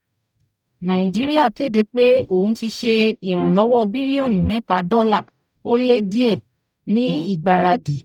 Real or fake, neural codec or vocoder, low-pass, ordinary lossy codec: fake; codec, 44.1 kHz, 0.9 kbps, DAC; 19.8 kHz; none